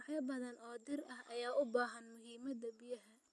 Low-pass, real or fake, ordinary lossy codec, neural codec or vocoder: none; real; none; none